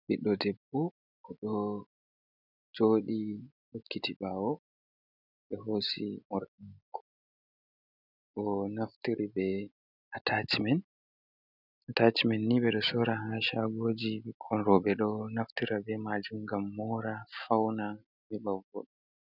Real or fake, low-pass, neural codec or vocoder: real; 5.4 kHz; none